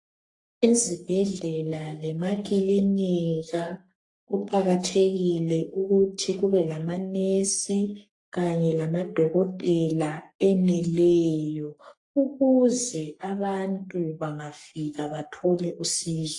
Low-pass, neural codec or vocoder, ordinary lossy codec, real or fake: 10.8 kHz; codec, 44.1 kHz, 3.4 kbps, Pupu-Codec; AAC, 48 kbps; fake